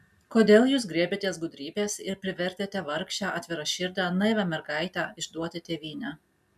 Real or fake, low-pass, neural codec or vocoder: real; 14.4 kHz; none